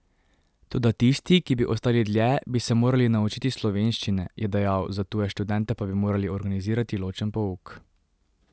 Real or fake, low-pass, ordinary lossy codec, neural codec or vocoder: real; none; none; none